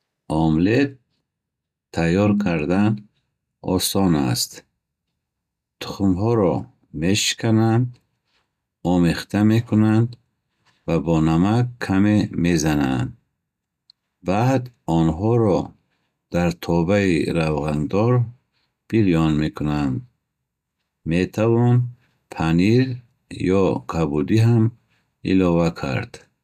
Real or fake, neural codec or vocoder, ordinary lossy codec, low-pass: real; none; none; 14.4 kHz